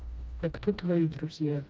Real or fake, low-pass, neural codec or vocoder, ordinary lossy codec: fake; none; codec, 16 kHz, 1 kbps, FreqCodec, smaller model; none